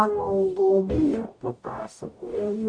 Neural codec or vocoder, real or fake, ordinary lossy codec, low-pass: codec, 44.1 kHz, 0.9 kbps, DAC; fake; AAC, 64 kbps; 9.9 kHz